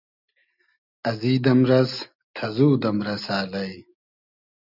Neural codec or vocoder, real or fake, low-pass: none; real; 5.4 kHz